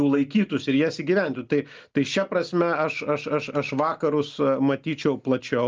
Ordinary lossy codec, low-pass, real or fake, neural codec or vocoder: Opus, 24 kbps; 7.2 kHz; real; none